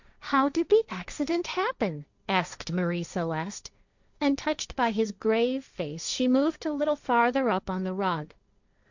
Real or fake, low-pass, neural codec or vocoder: fake; 7.2 kHz; codec, 16 kHz, 1.1 kbps, Voila-Tokenizer